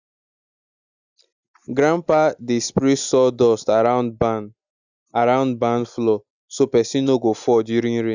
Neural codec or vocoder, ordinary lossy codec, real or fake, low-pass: none; none; real; 7.2 kHz